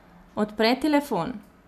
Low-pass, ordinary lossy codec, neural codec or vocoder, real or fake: 14.4 kHz; none; none; real